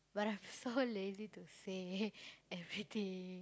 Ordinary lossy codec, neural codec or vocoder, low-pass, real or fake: none; none; none; real